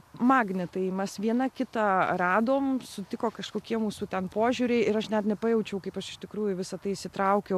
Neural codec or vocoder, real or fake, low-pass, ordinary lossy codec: none; real; 14.4 kHz; AAC, 96 kbps